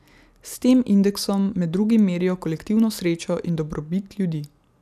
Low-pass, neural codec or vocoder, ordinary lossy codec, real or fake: 14.4 kHz; none; none; real